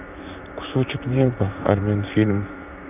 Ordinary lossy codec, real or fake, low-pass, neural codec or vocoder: none; fake; 3.6 kHz; vocoder, 44.1 kHz, 128 mel bands, Pupu-Vocoder